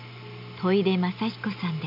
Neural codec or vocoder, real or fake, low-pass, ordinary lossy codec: none; real; 5.4 kHz; none